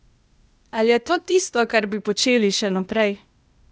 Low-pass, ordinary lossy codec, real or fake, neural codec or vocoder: none; none; fake; codec, 16 kHz, 0.8 kbps, ZipCodec